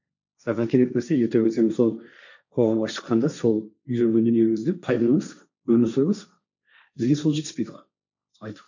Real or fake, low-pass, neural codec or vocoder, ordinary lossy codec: fake; 7.2 kHz; codec, 16 kHz, 1.1 kbps, Voila-Tokenizer; none